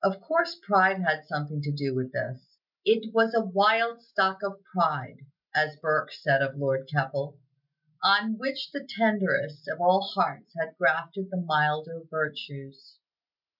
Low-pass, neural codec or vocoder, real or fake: 5.4 kHz; none; real